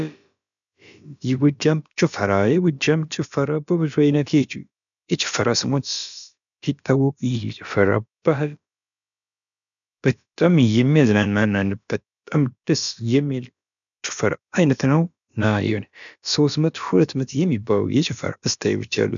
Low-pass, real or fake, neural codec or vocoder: 7.2 kHz; fake; codec, 16 kHz, about 1 kbps, DyCAST, with the encoder's durations